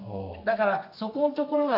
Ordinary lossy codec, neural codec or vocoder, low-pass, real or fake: AAC, 32 kbps; codec, 32 kHz, 1.9 kbps, SNAC; 5.4 kHz; fake